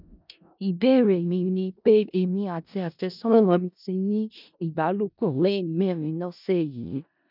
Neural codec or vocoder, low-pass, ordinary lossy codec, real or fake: codec, 16 kHz in and 24 kHz out, 0.4 kbps, LongCat-Audio-Codec, four codebook decoder; 5.4 kHz; none; fake